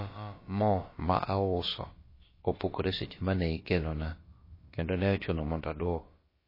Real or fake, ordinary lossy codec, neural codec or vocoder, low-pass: fake; MP3, 24 kbps; codec, 16 kHz, about 1 kbps, DyCAST, with the encoder's durations; 5.4 kHz